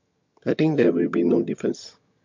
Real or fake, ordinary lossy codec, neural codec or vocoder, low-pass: fake; MP3, 48 kbps; vocoder, 22.05 kHz, 80 mel bands, HiFi-GAN; 7.2 kHz